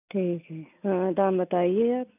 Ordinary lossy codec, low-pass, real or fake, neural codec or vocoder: none; 3.6 kHz; real; none